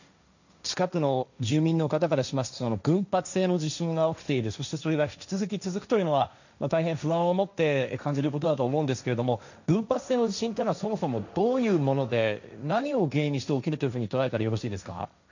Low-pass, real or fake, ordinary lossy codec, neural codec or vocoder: 7.2 kHz; fake; none; codec, 16 kHz, 1.1 kbps, Voila-Tokenizer